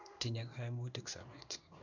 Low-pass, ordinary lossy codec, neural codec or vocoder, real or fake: 7.2 kHz; none; codec, 16 kHz in and 24 kHz out, 1 kbps, XY-Tokenizer; fake